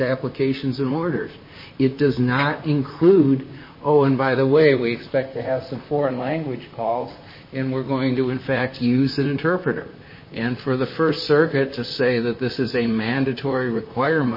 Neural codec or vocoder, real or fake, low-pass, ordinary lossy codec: vocoder, 44.1 kHz, 128 mel bands, Pupu-Vocoder; fake; 5.4 kHz; MP3, 24 kbps